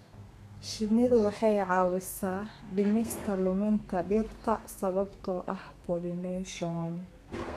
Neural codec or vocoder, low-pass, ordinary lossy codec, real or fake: codec, 32 kHz, 1.9 kbps, SNAC; 14.4 kHz; none; fake